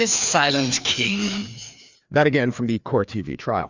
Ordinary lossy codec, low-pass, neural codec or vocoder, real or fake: Opus, 64 kbps; 7.2 kHz; codec, 16 kHz, 2 kbps, FreqCodec, larger model; fake